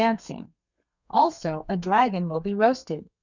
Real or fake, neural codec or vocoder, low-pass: fake; codec, 32 kHz, 1.9 kbps, SNAC; 7.2 kHz